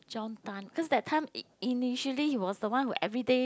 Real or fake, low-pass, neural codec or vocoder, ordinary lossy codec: real; none; none; none